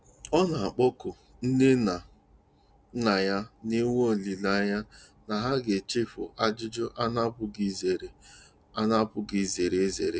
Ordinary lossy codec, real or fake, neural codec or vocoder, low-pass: none; real; none; none